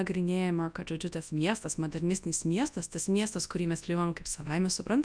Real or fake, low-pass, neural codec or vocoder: fake; 9.9 kHz; codec, 24 kHz, 0.9 kbps, WavTokenizer, large speech release